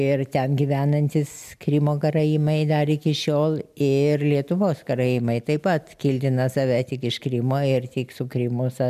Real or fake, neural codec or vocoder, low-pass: real; none; 14.4 kHz